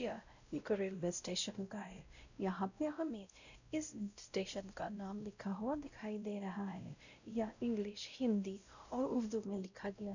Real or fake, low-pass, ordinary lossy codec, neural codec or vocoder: fake; 7.2 kHz; none; codec, 16 kHz, 0.5 kbps, X-Codec, WavLM features, trained on Multilingual LibriSpeech